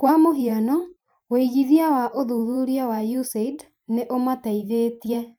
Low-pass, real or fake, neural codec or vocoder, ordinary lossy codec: none; fake; vocoder, 44.1 kHz, 128 mel bands every 512 samples, BigVGAN v2; none